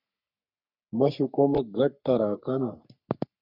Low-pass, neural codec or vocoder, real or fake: 5.4 kHz; codec, 44.1 kHz, 3.4 kbps, Pupu-Codec; fake